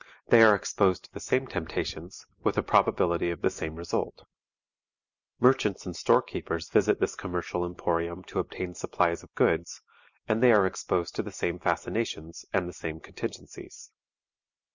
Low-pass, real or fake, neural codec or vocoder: 7.2 kHz; real; none